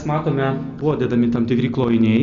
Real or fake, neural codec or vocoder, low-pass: real; none; 7.2 kHz